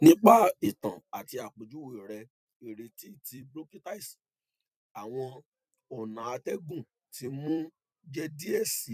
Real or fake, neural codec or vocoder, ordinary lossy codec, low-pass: fake; vocoder, 44.1 kHz, 128 mel bands every 256 samples, BigVGAN v2; none; 14.4 kHz